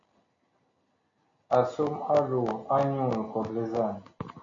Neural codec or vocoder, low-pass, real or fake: none; 7.2 kHz; real